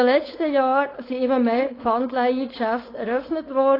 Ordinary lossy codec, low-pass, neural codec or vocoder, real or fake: AAC, 24 kbps; 5.4 kHz; codec, 16 kHz, 4.8 kbps, FACodec; fake